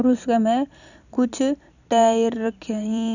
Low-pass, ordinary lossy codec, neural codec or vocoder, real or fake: 7.2 kHz; none; vocoder, 44.1 kHz, 128 mel bands every 256 samples, BigVGAN v2; fake